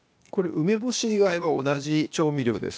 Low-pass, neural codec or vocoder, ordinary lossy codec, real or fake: none; codec, 16 kHz, 0.8 kbps, ZipCodec; none; fake